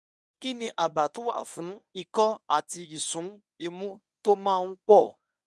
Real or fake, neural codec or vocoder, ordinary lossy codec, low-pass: fake; codec, 24 kHz, 0.9 kbps, WavTokenizer, medium speech release version 1; none; none